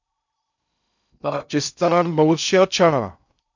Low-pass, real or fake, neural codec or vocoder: 7.2 kHz; fake; codec, 16 kHz in and 24 kHz out, 0.6 kbps, FocalCodec, streaming, 2048 codes